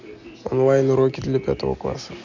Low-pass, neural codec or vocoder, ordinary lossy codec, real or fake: 7.2 kHz; none; none; real